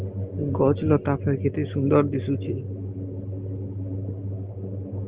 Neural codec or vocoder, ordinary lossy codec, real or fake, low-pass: none; Opus, 16 kbps; real; 3.6 kHz